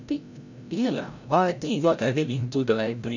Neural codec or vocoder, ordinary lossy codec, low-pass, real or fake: codec, 16 kHz, 0.5 kbps, FreqCodec, larger model; none; 7.2 kHz; fake